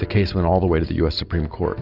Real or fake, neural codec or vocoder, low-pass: real; none; 5.4 kHz